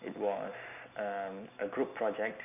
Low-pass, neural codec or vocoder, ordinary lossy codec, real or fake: 3.6 kHz; none; none; real